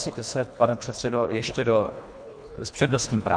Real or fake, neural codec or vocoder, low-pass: fake; codec, 24 kHz, 1.5 kbps, HILCodec; 9.9 kHz